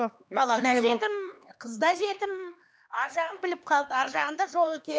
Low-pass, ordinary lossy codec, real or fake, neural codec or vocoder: none; none; fake; codec, 16 kHz, 2 kbps, X-Codec, HuBERT features, trained on LibriSpeech